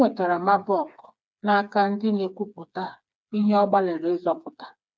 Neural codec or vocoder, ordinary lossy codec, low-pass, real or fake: codec, 16 kHz, 4 kbps, FreqCodec, smaller model; none; none; fake